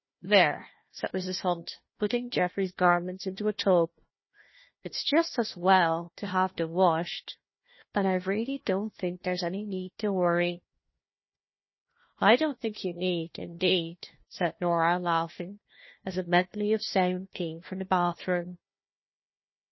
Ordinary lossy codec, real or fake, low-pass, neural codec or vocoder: MP3, 24 kbps; fake; 7.2 kHz; codec, 16 kHz, 1 kbps, FunCodec, trained on Chinese and English, 50 frames a second